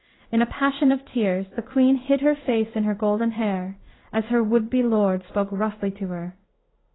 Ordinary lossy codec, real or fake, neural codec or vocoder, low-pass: AAC, 16 kbps; fake; codec, 16 kHz in and 24 kHz out, 1 kbps, XY-Tokenizer; 7.2 kHz